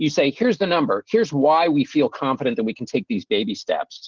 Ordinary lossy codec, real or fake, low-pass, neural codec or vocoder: Opus, 16 kbps; real; 7.2 kHz; none